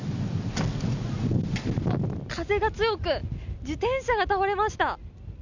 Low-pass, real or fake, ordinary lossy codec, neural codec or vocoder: 7.2 kHz; real; none; none